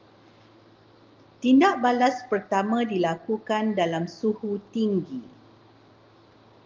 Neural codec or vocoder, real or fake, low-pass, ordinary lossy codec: none; real; 7.2 kHz; Opus, 24 kbps